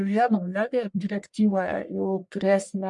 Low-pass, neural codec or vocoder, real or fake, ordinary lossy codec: 10.8 kHz; codec, 44.1 kHz, 1.7 kbps, Pupu-Codec; fake; MP3, 64 kbps